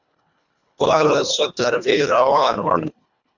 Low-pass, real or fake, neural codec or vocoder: 7.2 kHz; fake; codec, 24 kHz, 1.5 kbps, HILCodec